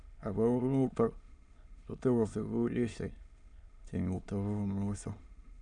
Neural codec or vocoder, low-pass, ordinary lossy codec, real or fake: autoencoder, 22.05 kHz, a latent of 192 numbers a frame, VITS, trained on many speakers; 9.9 kHz; none; fake